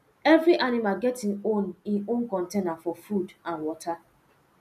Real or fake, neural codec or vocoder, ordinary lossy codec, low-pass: real; none; none; 14.4 kHz